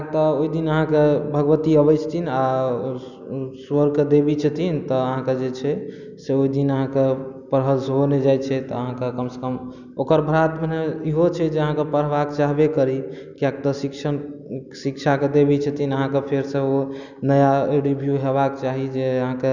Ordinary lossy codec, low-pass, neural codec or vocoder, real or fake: none; 7.2 kHz; none; real